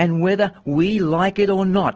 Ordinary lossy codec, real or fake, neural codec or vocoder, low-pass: Opus, 16 kbps; real; none; 7.2 kHz